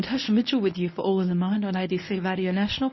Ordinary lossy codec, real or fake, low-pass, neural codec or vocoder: MP3, 24 kbps; fake; 7.2 kHz; codec, 24 kHz, 0.9 kbps, WavTokenizer, medium speech release version 1